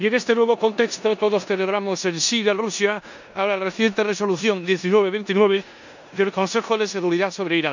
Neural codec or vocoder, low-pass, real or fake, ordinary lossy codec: codec, 16 kHz in and 24 kHz out, 0.9 kbps, LongCat-Audio-Codec, four codebook decoder; 7.2 kHz; fake; none